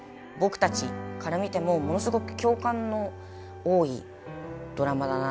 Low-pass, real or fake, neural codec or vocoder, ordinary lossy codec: none; real; none; none